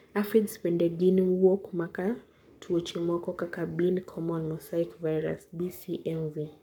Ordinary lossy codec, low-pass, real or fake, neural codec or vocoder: none; 19.8 kHz; fake; codec, 44.1 kHz, 7.8 kbps, Pupu-Codec